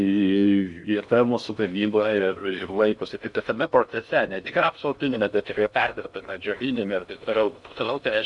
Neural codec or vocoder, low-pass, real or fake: codec, 16 kHz in and 24 kHz out, 0.6 kbps, FocalCodec, streaming, 4096 codes; 10.8 kHz; fake